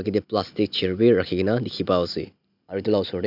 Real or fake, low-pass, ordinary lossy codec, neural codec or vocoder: real; 5.4 kHz; none; none